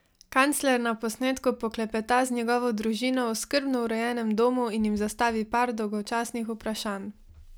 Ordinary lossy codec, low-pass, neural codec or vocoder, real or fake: none; none; none; real